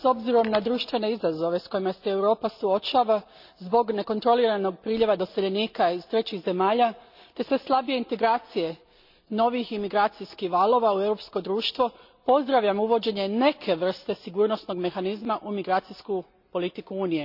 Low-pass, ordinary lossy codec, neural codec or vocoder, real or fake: 5.4 kHz; none; none; real